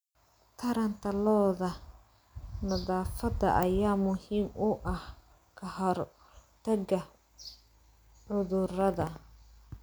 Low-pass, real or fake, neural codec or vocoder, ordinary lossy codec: none; real; none; none